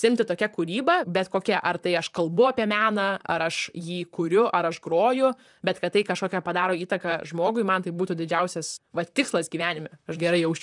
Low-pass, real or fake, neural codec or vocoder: 10.8 kHz; fake; vocoder, 44.1 kHz, 128 mel bands, Pupu-Vocoder